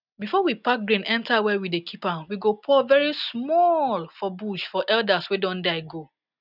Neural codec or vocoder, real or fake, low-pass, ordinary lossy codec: none; real; 5.4 kHz; none